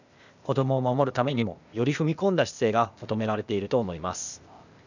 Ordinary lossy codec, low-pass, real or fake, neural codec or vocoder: none; 7.2 kHz; fake; codec, 16 kHz, 0.8 kbps, ZipCodec